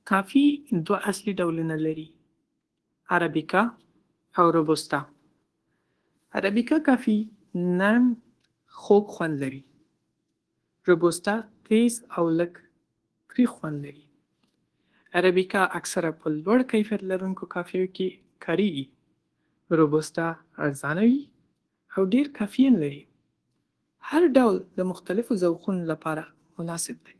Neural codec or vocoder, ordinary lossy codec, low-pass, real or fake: codec, 24 kHz, 1.2 kbps, DualCodec; Opus, 16 kbps; 10.8 kHz; fake